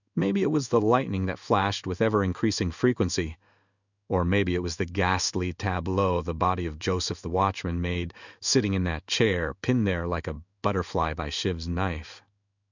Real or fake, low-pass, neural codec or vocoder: fake; 7.2 kHz; codec, 16 kHz in and 24 kHz out, 1 kbps, XY-Tokenizer